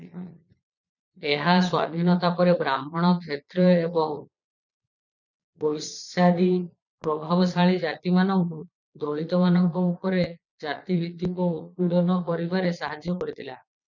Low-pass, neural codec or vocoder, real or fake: 7.2 kHz; vocoder, 22.05 kHz, 80 mel bands, Vocos; fake